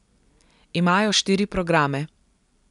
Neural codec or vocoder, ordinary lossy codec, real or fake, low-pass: none; none; real; 10.8 kHz